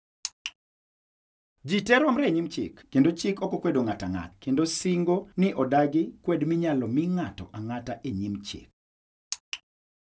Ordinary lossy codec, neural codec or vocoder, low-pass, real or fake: none; none; none; real